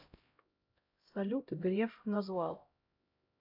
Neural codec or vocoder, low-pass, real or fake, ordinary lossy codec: codec, 16 kHz, 0.5 kbps, X-Codec, HuBERT features, trained on LibriSpeech; 5.4 kHz; fake; AAC, 32 kbps